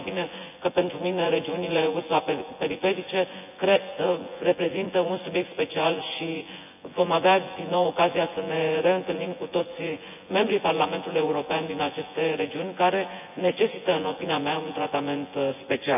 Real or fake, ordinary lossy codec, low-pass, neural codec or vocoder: fake; none; 3.6 kHz; vocoder, 24 kHz, 100 mel bands, Vocos